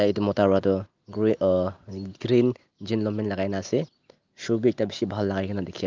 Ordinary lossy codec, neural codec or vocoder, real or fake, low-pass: Opus, 32 kbps; none; real; 7.2 kHz